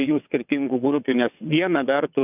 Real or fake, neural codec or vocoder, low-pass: fake; vocoder, 22.05 kHz, 80 mel bands, WaveNeXt; 3.6 kHz